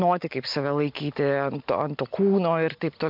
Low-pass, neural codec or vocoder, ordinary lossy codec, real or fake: 5.4 kHz; none; MP3, 48 kbps; real